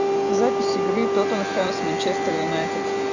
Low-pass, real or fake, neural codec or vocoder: 7.2 kHz; real; none